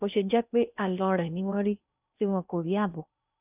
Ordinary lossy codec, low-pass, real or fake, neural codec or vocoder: none; 3.6 kHz; fake; codec, 16 kHz in and 24 kHz out, 0.6 kbps, FocalCodec, streaming, 2048 codes